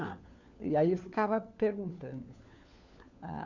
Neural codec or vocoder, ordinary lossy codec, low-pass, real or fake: codec, 16 kHz, 4 kbps, FunCodec, trained on LibriTTS, 50 frames a second; none; 7.2 kHz; fake